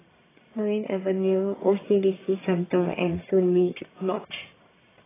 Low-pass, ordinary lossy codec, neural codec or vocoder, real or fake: 3.6 kHz; AAC, 16 kbps; codec, 44.1 kHz, 1.7 kbps, Pupu-Codec; fake